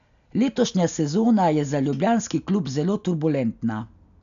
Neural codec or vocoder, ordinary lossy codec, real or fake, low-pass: none; MP3, 96 kbps; real; 7.2 kHz